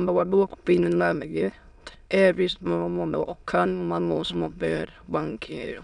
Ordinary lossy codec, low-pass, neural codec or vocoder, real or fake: none; 9.9 kHz; autoencoder, 22.05 kHz, a latent of 192 numbers a frame, VITS, trained on many speakers; fake